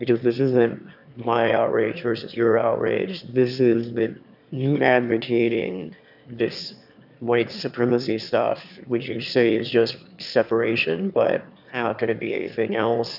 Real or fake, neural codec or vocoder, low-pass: fake; autoencoder, 22.05 kHz, a latent of 192 numbers a frame, VITS, trained on one speaker; 5.4 kHz